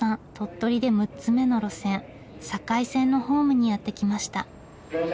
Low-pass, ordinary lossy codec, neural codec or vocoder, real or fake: none; none; none; real